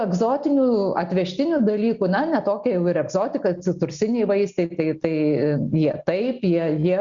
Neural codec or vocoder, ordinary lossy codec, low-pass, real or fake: none; Opus, 64 kbps; 7.2 kHz; real